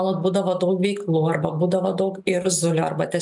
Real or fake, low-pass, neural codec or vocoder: real; 10.8 kHz; none